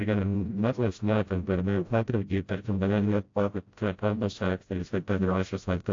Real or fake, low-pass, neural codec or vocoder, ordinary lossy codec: fake; 7.2 kHz; codec, 16 kHz, 0.5 kbps, FreqCodec, smaller model; AAC, 48 kbps